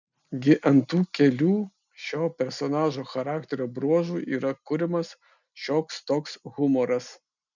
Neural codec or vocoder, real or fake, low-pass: none; real; 7.2 kHz